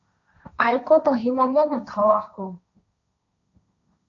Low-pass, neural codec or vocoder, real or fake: 7.2 kHz; codec, 16 kHz, 1.1 kbps, Voila-Tokenizer; fake